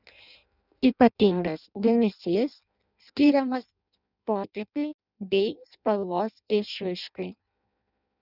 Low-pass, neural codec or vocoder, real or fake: 5.4 kHz; codec, 16 kHz in and 24 kHz out, 0.6 kbps, FireRedTTS-2 codec; fake